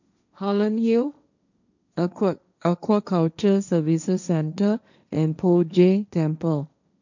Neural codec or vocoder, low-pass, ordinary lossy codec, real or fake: codec, 16 kHz, 1.1 kbps, Voila-Tokenizer; 7.2 kHz; none; fake